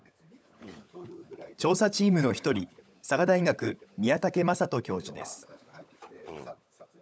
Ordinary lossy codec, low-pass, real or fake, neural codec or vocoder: none; none; fake; codec, 16 kHz, 16 kbps, FunCodec, trained on LibriTTS, 50 frames a second